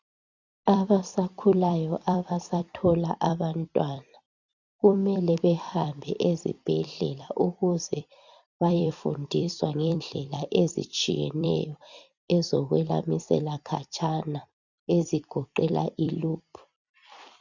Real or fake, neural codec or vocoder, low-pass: fake; vocoder, 44.1 kHz, 128 mel bands every 256 samples, BigVGAN v2; 7.2 kHz